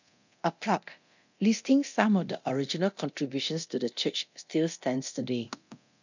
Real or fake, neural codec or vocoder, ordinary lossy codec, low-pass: fake; codec, 24 kHz, 0.9 kbps, DualCodec; none; 7.2 kHz